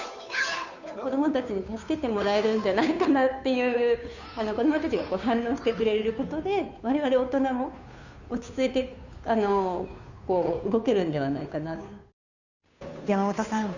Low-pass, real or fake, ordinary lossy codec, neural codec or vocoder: 7.2 kHz; fake; none; codec, 16 kHz, 2 kbps, FunCodec, trained on Chinese and English, 25 frames a second